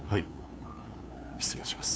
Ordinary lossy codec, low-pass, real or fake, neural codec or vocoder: none; none; fake; codec, 16 kHz, 2 kbps, FunCodec, trained on LibriTTS, 25 frames a second